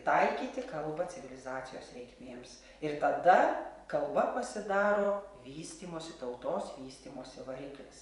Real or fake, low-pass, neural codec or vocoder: fake; 10.8 kHz; vocoder, 24 kHz, 100 mel bands, Vocos